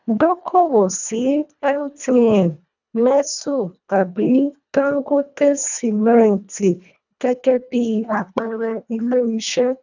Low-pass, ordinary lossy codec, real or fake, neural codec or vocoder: 7.2 kHz; none; fake; codec, 24 kHz, 1.5 kbps, HILCodec